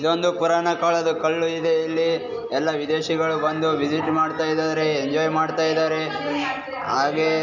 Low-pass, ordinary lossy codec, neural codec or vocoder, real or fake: 7.2 kHz; none; none; real